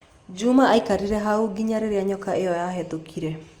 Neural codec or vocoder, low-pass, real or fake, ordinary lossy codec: none; 19.8 kHz; real; Opus, 32 kbps